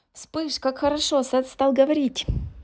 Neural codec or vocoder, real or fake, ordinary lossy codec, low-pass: none; real; none; none